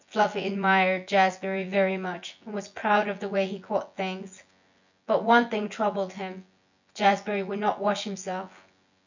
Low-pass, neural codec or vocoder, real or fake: 7.2 kHz; vocoder, 24 kHz, 100 mel bands, Vocos; fake